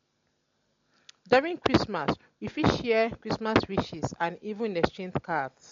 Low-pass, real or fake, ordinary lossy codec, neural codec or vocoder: 7.2 kHz; real; MP3, 48 kbps; none